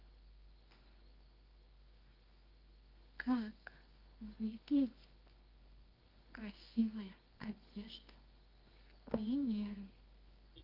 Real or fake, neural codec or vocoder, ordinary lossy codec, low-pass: fake; codec, 24 kHz, 0.9 kbps, WavTokenizer, medium music audio release; Opus, 24 kbps; 5.4 kHz